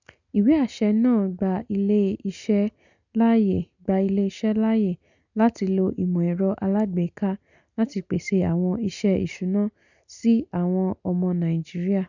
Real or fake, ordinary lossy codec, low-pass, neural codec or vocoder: real; none; 7.2 kHz; none